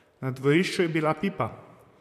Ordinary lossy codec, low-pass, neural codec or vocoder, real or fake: MP3, 96 kbps; 14.4 kHz; vocoder, 44.1 kHz, 128 mel bands, Pupu-Vocoder; fake